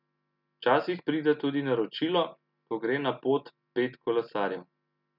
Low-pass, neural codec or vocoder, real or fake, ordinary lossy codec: 5.4 kHz; none; real; none